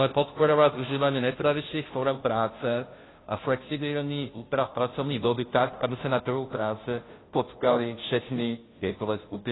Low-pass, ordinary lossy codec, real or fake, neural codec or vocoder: 7.2 kHz; AAC, 16 kbps; fake; codec, 16 kHz, 0.5 kbps, FunCodec, trained on Chinese and English, 25 frames a second